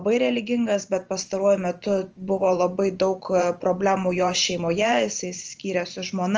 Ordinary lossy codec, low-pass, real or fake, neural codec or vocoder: Opus, 24 kbps; 7.2 kHz; fake; vocoder, 44.1 kHz, 128 mel bands every 512 samples, BigVGAN v2